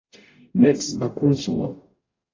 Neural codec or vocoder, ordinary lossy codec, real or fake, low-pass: codec, 44.1 kHz, 0.9 kbps, DAC; AAC, 32 kbps; fake; 7.2 kHz